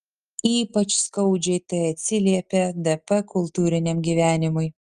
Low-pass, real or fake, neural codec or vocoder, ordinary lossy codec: 10.8 kHz; real; none; Opus, 24 kbps